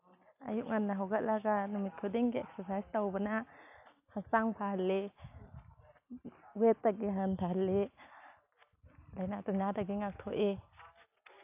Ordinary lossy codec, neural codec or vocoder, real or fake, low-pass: AAC, 32 kbps; none; real; 3.6 kHz